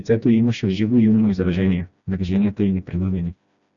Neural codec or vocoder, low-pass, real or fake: codec, 16 kHz, 1 kbps, FreqCodec, smaller model; 7.2 kHz; fake